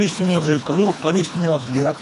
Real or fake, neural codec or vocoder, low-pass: fake; codec, 24 kHz, 1.5 kbps, HILCodec; 10.8 kHz